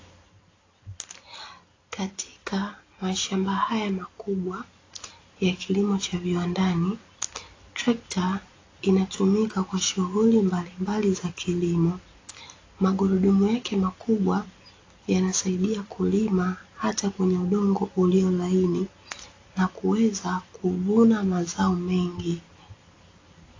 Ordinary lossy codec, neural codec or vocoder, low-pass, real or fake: AAC, 32 kbps; none; 7.2 kHz; real